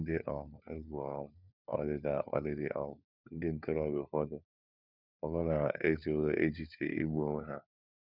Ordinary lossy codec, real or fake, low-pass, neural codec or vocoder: none; fake; 5.4 kHz; codec, 16 kHz, 4 kbps, FunCodec, trained on LibriTTS, 50 frames a second